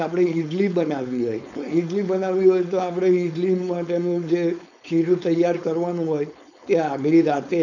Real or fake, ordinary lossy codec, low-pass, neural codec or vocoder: fake; none; 7.2 kHz; codec, 16 kHz, 4.8 kbps, FACodec